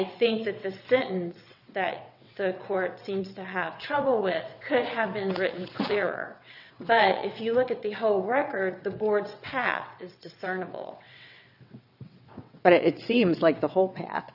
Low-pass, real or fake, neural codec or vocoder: 5.4 kHz; fake; vocoder, 22.05 kHz, 80 mel bands, WaveNeXt